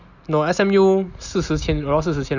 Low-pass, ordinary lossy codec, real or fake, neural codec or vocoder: 7.2 kHz; none; real; none